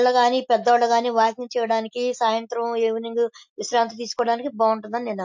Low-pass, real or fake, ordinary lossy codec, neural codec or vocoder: 7.2 kHz; real; MP3, 48 kbps; none